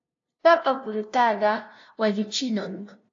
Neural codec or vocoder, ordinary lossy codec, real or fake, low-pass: codec, 16 kHz, 0.5 kbps, FunCodec, trained on LibriTTS, 25 frames a second; AAC, 48 kbps; fake; 7.2 kHz